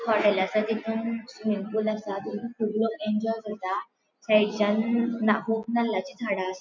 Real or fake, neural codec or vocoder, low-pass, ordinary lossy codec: real; none; 7.2 kHz; MP3, 64 kbps